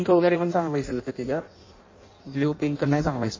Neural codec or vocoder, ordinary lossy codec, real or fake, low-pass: codec, 16 kHz in and 24 kHz out, 0.6 kbps, FireRedTTS-2 codec; MP3, 32 kbps; fake; 7.2 kHz